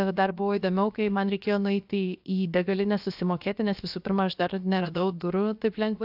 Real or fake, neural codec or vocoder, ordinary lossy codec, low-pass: fake; codec, 16 kHz, about 1 kbps, DyCAST, with the encoder's durations; AAC, 48 kbps; 5.4 kHz